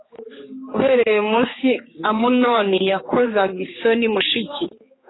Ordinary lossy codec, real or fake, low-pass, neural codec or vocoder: AAC, 16 kbps; fake; 7.2 kHz; codec, 16 kHz, 4 kbps, X-Codec, HuBERT features, trained on general audio